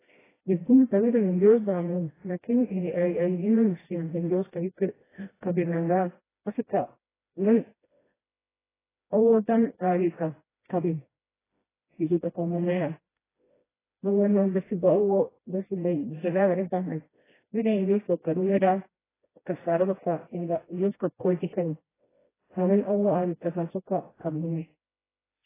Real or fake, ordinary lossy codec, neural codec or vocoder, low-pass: fake; AAC, 16 kbps; codec, 16 kHz, 1 kbps, FreqCodec, smaller model; 3.6 kHz